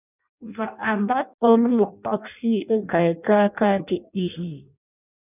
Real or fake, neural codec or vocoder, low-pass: fake; codec, 16 kHz in and 24 kHz out, 0.6 kbps, FireRedTTS-2 codec; 3.6 kHz